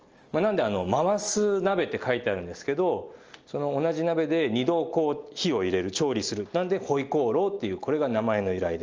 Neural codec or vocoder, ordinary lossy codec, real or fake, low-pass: none; Opus, 24 kbps; real; 7.2 kHz